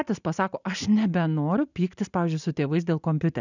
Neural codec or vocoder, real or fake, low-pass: none; real; 7.2 kHz